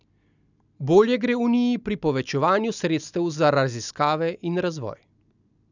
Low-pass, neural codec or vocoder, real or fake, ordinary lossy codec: 7.2 kHz; none; real; none